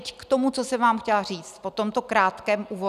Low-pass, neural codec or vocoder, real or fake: 14.4 kHz; none; real